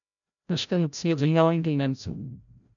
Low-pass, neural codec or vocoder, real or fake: 7.2 kHz; codec, 16 kHz, 0.5 kbps, FreqCodec, larger model; fake